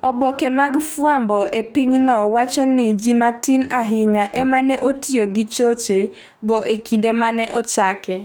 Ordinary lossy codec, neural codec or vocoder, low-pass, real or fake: none; codec, 44.1 kHz, 2.6 kbps, DAC; none; fake